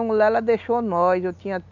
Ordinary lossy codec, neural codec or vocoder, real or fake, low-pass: none; none; real; 7.2 kHz